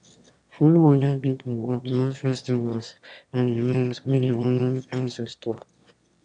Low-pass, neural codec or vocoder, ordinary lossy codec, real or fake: 9.9 kHz; autoencoder, 22.05 kHz, a latent of 192 numbers a frame, VITS, trained on one speaker; none; fake